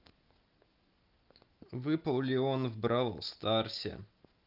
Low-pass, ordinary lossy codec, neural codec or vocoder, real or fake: 5.4 kHz; Opus, 24 kbps; none; real